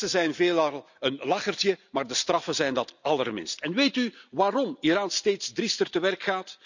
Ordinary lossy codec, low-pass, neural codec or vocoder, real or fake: none; 7.2 kHz; none; real